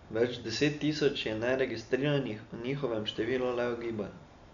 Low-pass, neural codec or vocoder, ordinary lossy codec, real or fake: 7.2 kHz; none; MP3, 96 kbps; real